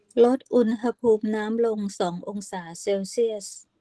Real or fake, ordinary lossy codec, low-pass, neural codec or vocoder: real; Opus, 16 kbps; 10.8 kHz; none